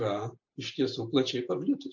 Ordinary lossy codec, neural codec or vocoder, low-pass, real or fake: MP3, 48 kbps; none; 7.2 kHz; real